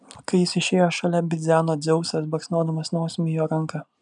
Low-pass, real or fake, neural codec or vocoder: 10.8 kHz; real; none